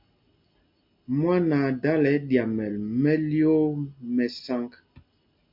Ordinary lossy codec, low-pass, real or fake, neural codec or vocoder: MP3, 48 kbps; 5.4 kHz; real; none